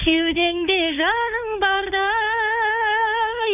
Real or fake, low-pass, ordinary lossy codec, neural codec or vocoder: fake; 3.6 kHz; none; codec, 16 kHz, 4 kbps, FunCodec, trained on Chinese and English, 50 frames a second